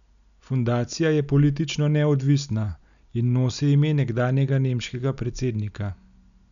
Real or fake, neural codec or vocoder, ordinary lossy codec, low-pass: real; none; none; 7.2 kHz